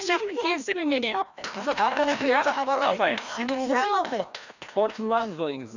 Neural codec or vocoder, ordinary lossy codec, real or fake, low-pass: codec, 16 kHz, 1 kbps, FreqCodec, larger model; none; fake; 7.2 kHz